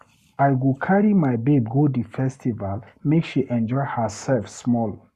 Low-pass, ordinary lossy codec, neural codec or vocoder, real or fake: 14.4 kHz; Opus, 64 kbps; codec, 44.1 kHz, 7.8 kbps, Pupu-Codec; fake